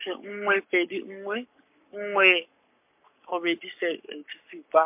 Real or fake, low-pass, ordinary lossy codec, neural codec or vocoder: fake; 3.6 kHz; MP3, 32 kbps; codec, 44.1 kHz, 7.8 kbps, Pupu-Codec